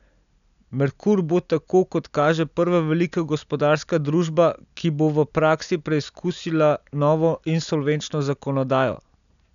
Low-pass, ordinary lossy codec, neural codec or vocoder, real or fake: 7.2 kHz; none; none; real